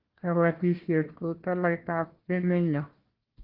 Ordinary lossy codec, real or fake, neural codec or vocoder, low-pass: Opus, 16 kbps; fake; codec, 16 kHz, 1 kbps, FunCodec, trained on Chinese and English, 50 frames a second; 5.4 kHz